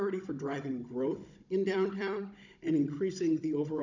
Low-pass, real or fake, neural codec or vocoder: 7.2 kHz; fake; codec, 16 kHz, 16 kbps, FunCodec, trained on Chinese and English, 50 frames a second